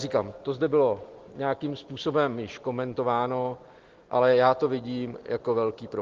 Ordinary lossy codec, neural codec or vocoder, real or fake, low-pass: Opus, 16 kbps; none; real; 7.2 kHz